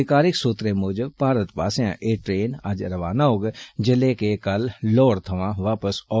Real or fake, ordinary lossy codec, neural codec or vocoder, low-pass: real; none; none; none